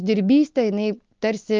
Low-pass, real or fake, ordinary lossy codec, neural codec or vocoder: 7.2 kHz; real; Opus, 24 kbps; none